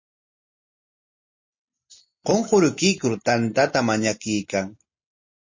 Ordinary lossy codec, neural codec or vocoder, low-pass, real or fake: MP3, 32 kbps; none; 7.2 kHz; real